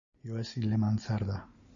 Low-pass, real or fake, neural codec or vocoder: 7.2 kHz; real; none